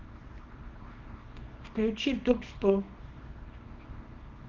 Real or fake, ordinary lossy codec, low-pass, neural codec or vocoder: fake; Opus, 24 kbps; 7.2 kHz; codec, 24 kHz, 0.9 kbps, WavTokenizer, small release